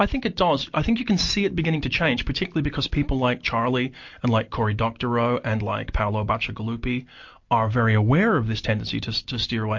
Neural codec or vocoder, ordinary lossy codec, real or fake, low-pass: none; MP3, 48 kbps; real; 7.2 kHz